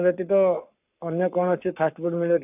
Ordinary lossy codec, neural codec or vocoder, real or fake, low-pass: none; codec, 44.1 kHz, 7.8 kbps, Pupu-Codec; fake; 3.6 kHz